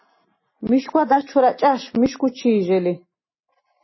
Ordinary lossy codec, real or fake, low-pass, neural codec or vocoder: MP3, 24 kbps; real; 7.2 kHz; none